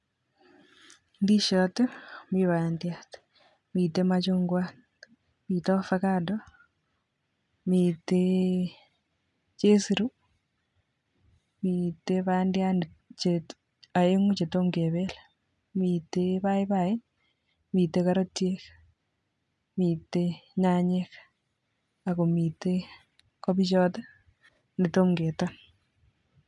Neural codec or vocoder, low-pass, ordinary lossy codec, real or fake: none; 10.8 kHz; none; real